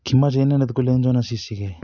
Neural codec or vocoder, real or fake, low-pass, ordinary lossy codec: none; real; 7.2 kHz; none